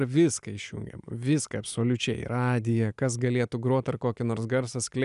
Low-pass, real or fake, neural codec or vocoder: 10.8 kHz; real; none